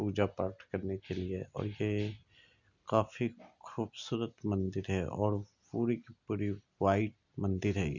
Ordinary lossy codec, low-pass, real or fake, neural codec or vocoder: none; 7.2 kHz; real; none